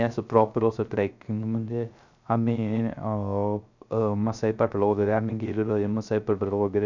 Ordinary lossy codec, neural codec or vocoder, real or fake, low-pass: none; codec, 16 kHz, 0.3 kbps, FocalCodec; fake; 7.2 kHz